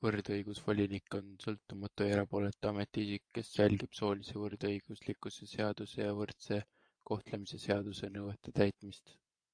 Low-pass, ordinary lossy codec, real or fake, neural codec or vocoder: 9.9 kHz; AAC, 64 kbps; fake; vocoder, 24 kHz, 100 mel bands, Vocos